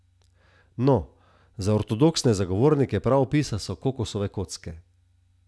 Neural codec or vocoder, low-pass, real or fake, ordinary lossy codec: none; none; real; none